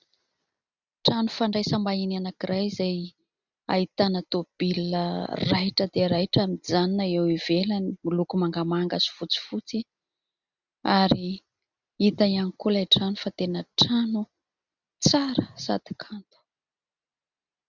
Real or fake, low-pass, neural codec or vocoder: real; 7.2 kHz; none